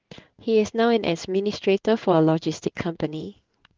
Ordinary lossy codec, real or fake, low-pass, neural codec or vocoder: Opus, 32 kbps; fake; 7.2 kHz; codec, 16 kHz in and 24 kHz out, 1 kbps, XY-Tokenizer